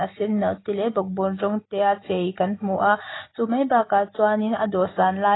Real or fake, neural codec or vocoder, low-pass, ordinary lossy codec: real; none; 7.2 kHz; AAC, 16 kbps